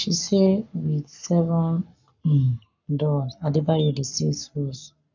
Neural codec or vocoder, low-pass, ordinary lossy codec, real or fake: codec, 44.1 kHz, 7.8 kbps, Pupu-Codec; 7.2 kHz; none; fake